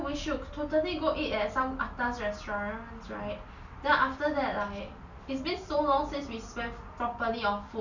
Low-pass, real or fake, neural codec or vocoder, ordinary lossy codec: 7.2 kHz; real; none; none